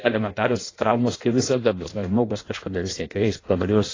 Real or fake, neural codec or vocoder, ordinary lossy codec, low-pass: fake; codec, 16 kHz in and 24 kHz out, 0.6 kbps, FireRedTTS-2 codec; AAC, 32 kbps; 7.2 kHz